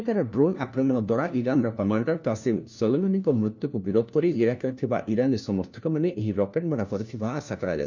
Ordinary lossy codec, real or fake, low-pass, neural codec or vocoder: none; fake; 7.2 kHz; codec, 16 kHz, 1 kbps, FunCodec, trained on LibriTTS, 50 frames a second